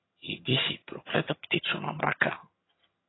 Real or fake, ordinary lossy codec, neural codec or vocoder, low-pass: fake; AAC, 16 kbps; vocoder, 22.05 kHz, 80 mel bands, HiFi-GAN; 7.2 kHz